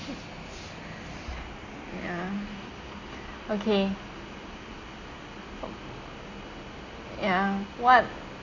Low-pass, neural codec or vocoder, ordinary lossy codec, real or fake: 7.2 kHz; none; AAC, 32 kbps; real